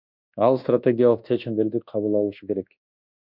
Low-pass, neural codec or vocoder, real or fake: 5.4 kHz; codec, 24 kHz, 1.2 kbps, DualCodec; fake